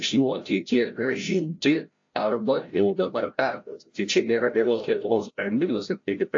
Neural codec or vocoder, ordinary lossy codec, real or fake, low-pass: codec, 16 kHz, 0.5 kbps, FreqCodec, larger model; AAC, 48 kbps; fake; 7.2 kHz